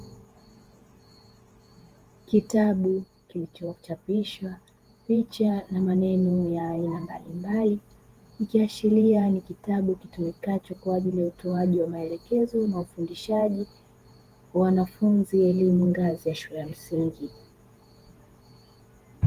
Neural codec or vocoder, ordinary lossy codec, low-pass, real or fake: vocoder, 48 kHz, 128 mel bands, Vocos; Opus, 24 kbps; 14.4 kHz; fake